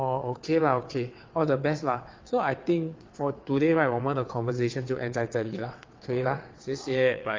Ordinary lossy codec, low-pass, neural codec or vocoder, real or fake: Opus, 24 kbps; 7.2 kHz; vocoder, 22.05 kHz, 80 mel bands, WaveNeXt; fake